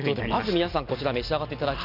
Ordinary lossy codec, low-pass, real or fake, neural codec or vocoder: none; 5.4 kHz; real; none